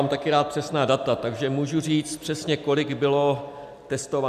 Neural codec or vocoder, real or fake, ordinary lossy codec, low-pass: none; real; MP3, 96 kbps; 14.4 kHz